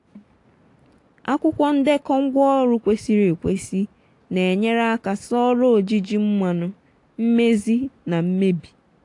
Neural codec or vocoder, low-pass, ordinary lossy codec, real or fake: none; 10.8 kHz; AAC, 48 kbps; real